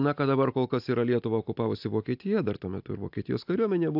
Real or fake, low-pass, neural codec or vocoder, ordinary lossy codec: real; 5.4 kHz; none; AAC, 48 kbps